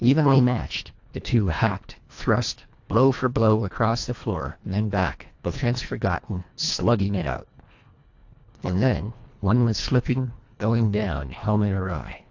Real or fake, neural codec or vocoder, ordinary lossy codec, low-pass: fake; codec, 24 kHz, 1.5 kbps, HILCodec; AAC, 48 kbps; 7.2 kHz